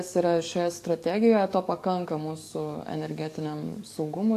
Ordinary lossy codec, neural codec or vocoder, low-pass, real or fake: AAC, 64 kbps; codec, 44.1 kHz, 7.8 kbps, DAC; 14.4 kHz; fake